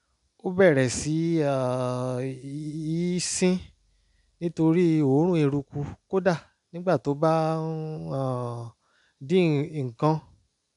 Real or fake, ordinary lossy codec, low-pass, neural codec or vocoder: real; none; 10.8 kHz; none